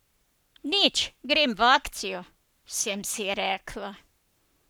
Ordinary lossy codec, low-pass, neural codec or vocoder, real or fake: none; none; codec, 44.1 kHz, 7.8 kbps, Pupu-Codec; fake